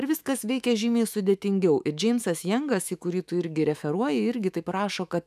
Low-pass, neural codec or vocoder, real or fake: 14.4 kHz; autoencoder, 48 kHz, 128 numbers a frame, DAC-VAE, trained on Japanese speech; fake